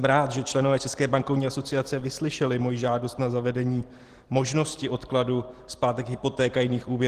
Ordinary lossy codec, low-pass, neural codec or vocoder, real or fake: Opus, 16 kbps; 14.4 kHz; none; real